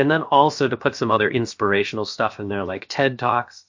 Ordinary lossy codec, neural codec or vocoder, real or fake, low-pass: MP3, 48 kbps; codec, 16 kHz, about 1 kbps, DyCAST, with the encoder's durations; fake; 7.2 kHz